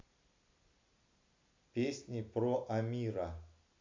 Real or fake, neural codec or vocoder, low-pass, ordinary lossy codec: real; none; 7.2 kHz; MP3, 48 kbps